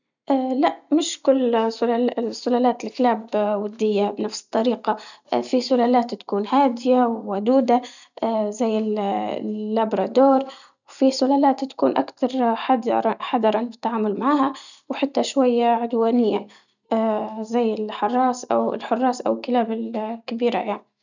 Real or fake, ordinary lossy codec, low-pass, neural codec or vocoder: real; none; 7.2 kHz; none